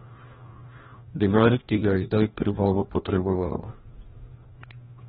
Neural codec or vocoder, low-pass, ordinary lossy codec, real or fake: codec, 16 kHz, 1 kbps, FreqCodec, larger model; 7.2 kHz; AAC, 16 kbps; fake